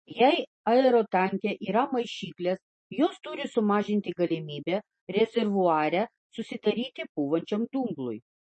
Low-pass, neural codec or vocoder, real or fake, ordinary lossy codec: 10.8 kHz; none; real; MP3, 32 kbps